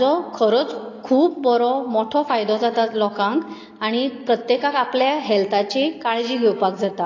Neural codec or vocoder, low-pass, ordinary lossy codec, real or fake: none; 7.2 kHz; AAC, 32 kbps; real